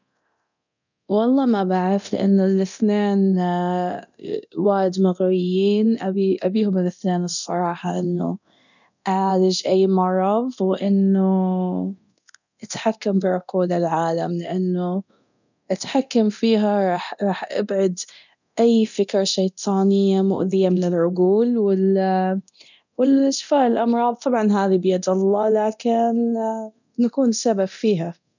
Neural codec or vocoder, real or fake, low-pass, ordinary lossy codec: codec, 24 kHz, 0.9 kbps, DualCodec; fake; 7.2 kHz; none